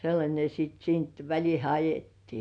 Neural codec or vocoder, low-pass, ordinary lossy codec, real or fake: none; 9.9 kHz; MP3, 96 kbps; real